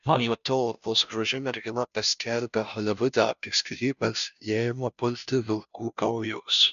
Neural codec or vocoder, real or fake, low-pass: codec, 16 kHz, 0.5 kbps, FunCodec, trained on Chinese and English, 25 frames a second; fake; 7.2 kHz